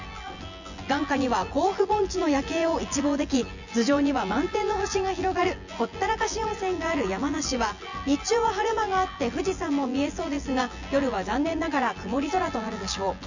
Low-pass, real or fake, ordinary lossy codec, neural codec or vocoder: 7.2 kHz; fake; none; vocoder, 24 kHz, 100 mel bands, Vocos